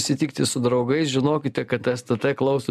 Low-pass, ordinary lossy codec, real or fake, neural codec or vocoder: 14.4 kHz; MP3, 96 kbps; real; none